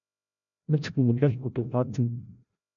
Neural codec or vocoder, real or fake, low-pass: codec, 16 kHz, 0.5 kbps, FreqCodec, larger model; fake; 7.2 kHz